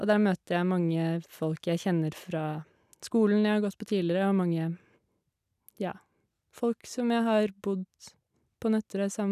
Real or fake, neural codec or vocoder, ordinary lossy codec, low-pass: real; none; none; 14.4 kHz